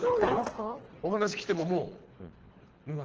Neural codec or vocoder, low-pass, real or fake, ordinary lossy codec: codec, 24 kHz, 3 kbps, HILCodec; 7.2 kHz; fake; Opus, 16 kbps